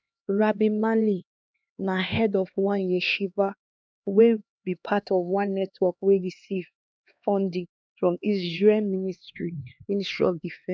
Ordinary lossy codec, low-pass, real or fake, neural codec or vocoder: none; none; fake; codec, 16 kHz, 2 kbps, X-Codec, HuBERT features, trained on LibriSpeech